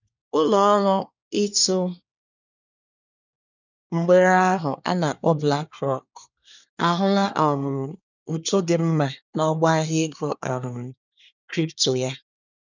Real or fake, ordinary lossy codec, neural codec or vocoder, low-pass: fake; none; codec, 24 kHz, 1 kbps, SNAC; 7.2 kHz